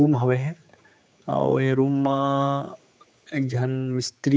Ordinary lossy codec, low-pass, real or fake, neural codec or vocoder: none; none; fake; codec, 16 kHz, 4 kbps, X-Codec, HuBERT features, trained on general audio